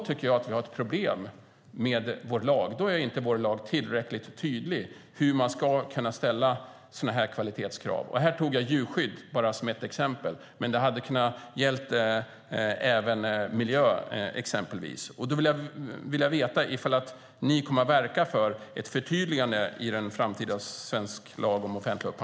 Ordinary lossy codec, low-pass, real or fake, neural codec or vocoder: none; none; real; none